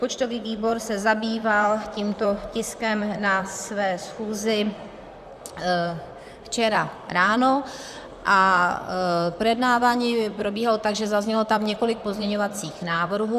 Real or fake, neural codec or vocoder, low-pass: fake; vocoder, 44.1 kHz, 128 mel bands, Pupu-Vocoder; 14.4 kHz